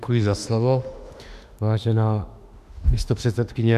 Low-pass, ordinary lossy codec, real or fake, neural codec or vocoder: 14.4 kHz; MP3, 96 kbps; fake; autoencoder, 48 kHz, 32 numbers a frame, DAC-VAE, trained on Japanese speech